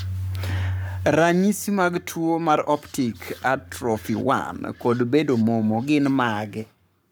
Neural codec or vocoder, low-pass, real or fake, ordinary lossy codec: codec, 44.1 kHz, 7.8 kbps, Pupu-Codec; none; fake; none